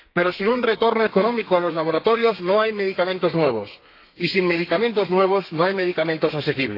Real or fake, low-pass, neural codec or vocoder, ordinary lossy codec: fake; 5.4 kHz; codec, 32 kHz, 1.9 kbps, SNAC; AAC, 32 kbps